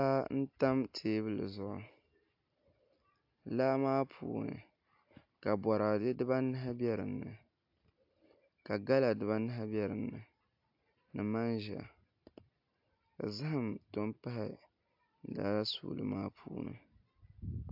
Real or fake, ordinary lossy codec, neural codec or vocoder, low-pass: real; AAC, 48 kbps; none; 5.4 kHz